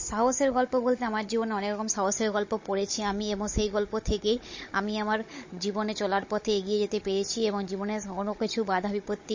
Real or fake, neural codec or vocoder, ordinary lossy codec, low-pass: fake; codec, 16 kHz, 16 kbps, FunCodec, trained on Chinese and English, 50 frames a second; MP3, 32 kbps; 7.2 kHz